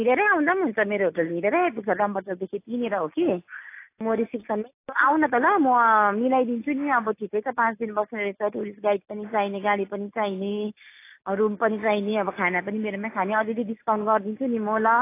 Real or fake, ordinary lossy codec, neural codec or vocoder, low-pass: real; AAC, 24 kbps; none; 3.6 kHz